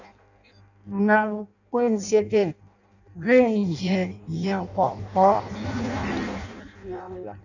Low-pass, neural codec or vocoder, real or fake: 7.2 kHz; codec, 16 kHz in and 24 kHz out, 0.6 kbps, FireRedTTS-2 codec; fake